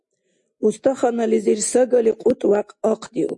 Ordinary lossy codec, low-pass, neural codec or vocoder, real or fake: MP3, 48 kbps; 10.8 kHz; none; real